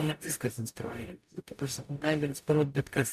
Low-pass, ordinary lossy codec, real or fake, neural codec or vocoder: 14.4 kHz; MP3, 96 kbps; fake; codec, 44.1 kHz, 0.9 kbps, DAC